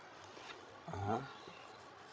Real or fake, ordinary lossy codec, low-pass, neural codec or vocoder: fake; none; none; codec, 16 kHz, 16 kbps, FreqCodec, larger model